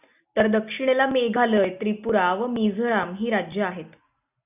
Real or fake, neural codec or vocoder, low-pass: real; none; 3.6 kHz